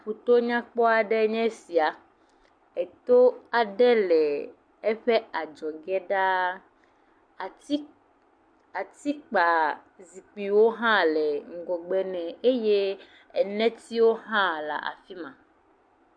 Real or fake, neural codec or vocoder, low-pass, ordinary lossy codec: real; none; 9.9 kHz; MP3, 64 kbps